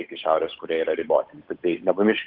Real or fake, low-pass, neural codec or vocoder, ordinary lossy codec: fake; 5.4 kHz; codec, 16 kHz, 8 kbps, FunCodec, trained on Chinese and English, 25 frames a second; Opus, 24 kbps